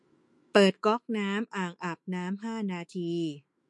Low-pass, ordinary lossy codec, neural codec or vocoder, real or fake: 10.8 kHz; MP3, 48 kbps; autoencoder, 48 kHz, 128 numbers a frame, DAC-VAE, trained on Japanese speech; fake